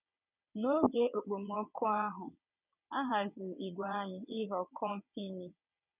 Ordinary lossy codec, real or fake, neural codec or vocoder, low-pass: none; fake; vocoder, 22.05 kHz, 80 mel bands, WaveNeXt; 3.6 kHz